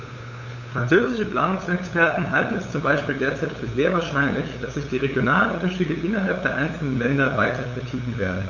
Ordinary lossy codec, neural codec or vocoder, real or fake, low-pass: none; codec, 16 kHz, 8 kbps, FunCodec, trained on LibriTTS, 25 frames a second; fake; 7.2 kHz